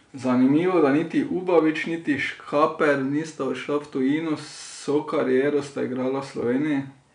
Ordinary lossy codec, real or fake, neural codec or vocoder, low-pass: none; real; none; 9.9 kHz